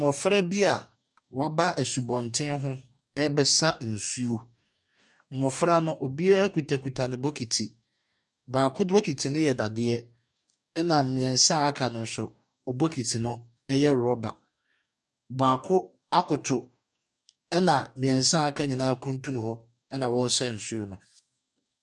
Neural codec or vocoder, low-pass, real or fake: codec, 44.1 kHz, 2.6 kbps, DAC; 10.8 kHz; fake